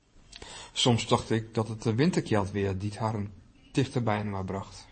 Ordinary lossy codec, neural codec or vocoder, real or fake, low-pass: MP3, 32 kbps; vocoder, 24 kHz, 100 mel bands, Vocos; fake; 9.9 kHz